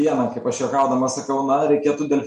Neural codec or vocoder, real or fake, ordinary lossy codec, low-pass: none; real; MP3, 48 kbps; 10.8 kHz